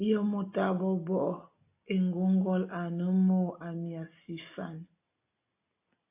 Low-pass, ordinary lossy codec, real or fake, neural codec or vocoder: 3.6 kHz; AAC, 24 kbps; real; none